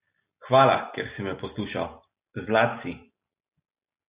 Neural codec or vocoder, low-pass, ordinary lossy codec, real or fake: none; 3.6 kHz; Opus, 64 kbps; real